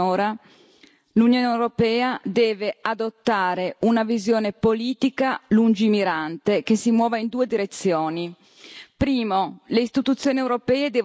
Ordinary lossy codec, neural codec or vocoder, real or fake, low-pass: none; none; real; none